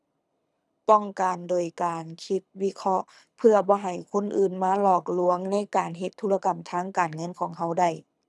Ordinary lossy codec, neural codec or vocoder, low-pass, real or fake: none; codec, 24 kHz, 6 kbps, HILCodec; none; fake